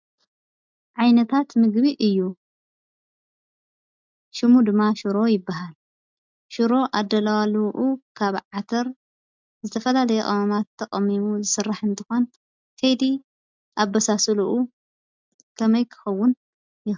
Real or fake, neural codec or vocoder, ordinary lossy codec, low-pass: real; none; MP3, 64 kbps; 7.2 kHz